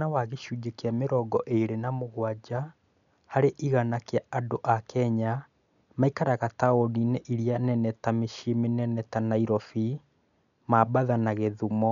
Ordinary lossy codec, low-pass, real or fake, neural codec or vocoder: none; 7.2 kHz; real; none